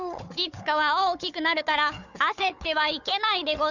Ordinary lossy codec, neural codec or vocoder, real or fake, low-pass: none; codec, 16 kHz, 4 kbps, FunCodec, trained on Chinese and English, 50 frames a second; fake; 7.2 kHz